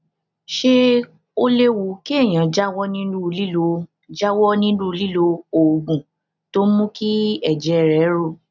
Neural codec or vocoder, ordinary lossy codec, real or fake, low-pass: none; none; real; 7.2 kHz